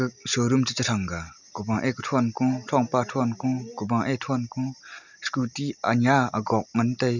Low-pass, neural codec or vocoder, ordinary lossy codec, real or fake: 7.2 kHz; none; none; real